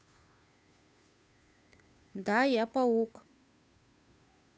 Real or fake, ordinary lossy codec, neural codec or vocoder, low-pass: fake; none; codec, 16 kHz, 2 kbps, FunCodec, trained on Chinese and English, 25 frames a second; none